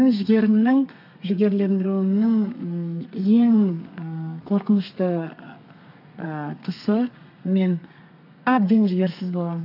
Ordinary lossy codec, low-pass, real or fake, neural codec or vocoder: none; 5.4 kHz; fake; codec, 32 kHz, 1.9 kbps, SNAC